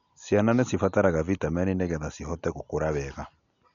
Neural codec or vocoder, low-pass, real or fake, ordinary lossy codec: none; 7.2 kHz; real; none